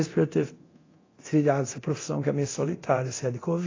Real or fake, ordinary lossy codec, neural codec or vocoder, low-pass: fake; AAC, 32 kbps; codec, 24 kHz, 0.9 kbps, DualCodec; 7.2 kHz